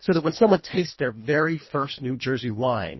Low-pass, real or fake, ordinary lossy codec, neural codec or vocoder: 7.2 kHz; fake; MP3, 24 kbps; codec, 24 kHz, 1.5 kbps, HILCodec